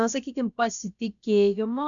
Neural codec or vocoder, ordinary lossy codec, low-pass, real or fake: codec, 16 kHz, about 1 kbps, DyCAST, with the encoder's durations; MP3, 64 kbps; 7.2 kHz; fake